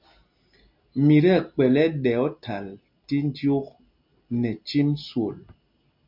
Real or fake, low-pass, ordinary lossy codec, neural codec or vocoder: real; 5.4 kHz; MP3, 48 kbps; none